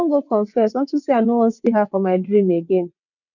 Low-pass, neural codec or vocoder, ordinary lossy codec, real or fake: 7.2 kHz; vocoder, 22.05 kHz, 80 mel bands, WaveNeXt; none; fake